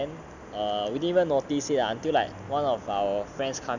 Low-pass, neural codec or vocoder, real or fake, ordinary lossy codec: 7.2 kHz; none; real; none